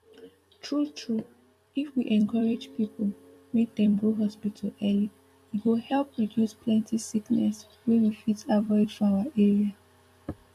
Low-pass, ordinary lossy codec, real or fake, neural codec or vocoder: 14.4 kHz; MP3, 96 kbps; fake; vocoder, 44.1 kHz, 128 mel bands every 256 samples, BigVGAN v2